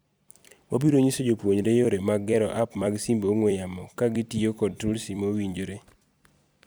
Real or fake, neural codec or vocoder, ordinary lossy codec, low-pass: fake; vocoder, 44.1 kHz, 128 mel bands every 256 samples, BigVGAN v2; none; none